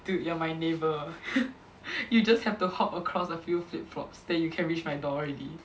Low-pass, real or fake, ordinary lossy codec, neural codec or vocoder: none; real; none; none